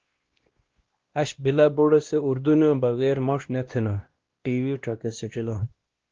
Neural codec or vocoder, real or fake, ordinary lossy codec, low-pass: codec, 16 kHz, 1 kbps, X-Codec, WavLM features, trained on Multilingual LibriSpeech; fake; Opus, 16 kbps; 7.2 kHz